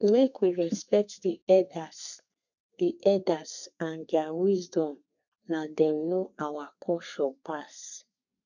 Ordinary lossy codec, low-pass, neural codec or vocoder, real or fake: none; 7.2 kHz; codec, 32 kHz, 1.9 kbps, SNAC; fake